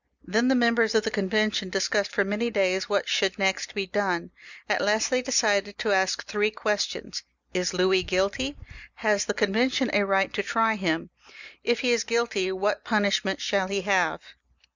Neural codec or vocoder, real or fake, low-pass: none; real; 7.2 kHz